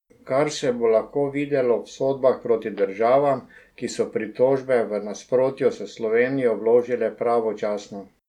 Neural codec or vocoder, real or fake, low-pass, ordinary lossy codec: none; real; 19.8 kHz; none